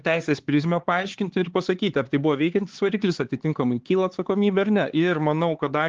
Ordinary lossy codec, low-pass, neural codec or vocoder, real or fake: Opus, 16 kbps; 7.2 kHz; codec, 16 kHz, 4 kbps, X-Codec, HuBERT features, trained on LibriSpeech; fake